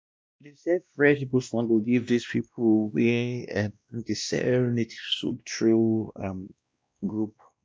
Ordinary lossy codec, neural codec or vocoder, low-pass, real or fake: none; codec, 16 kHz, 1 kbps, X-Codec, WavLM features, trained on Multilingual LibriSpeech; none; fake